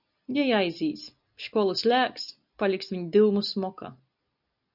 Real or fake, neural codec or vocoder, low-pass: real; none; 5.4 kHz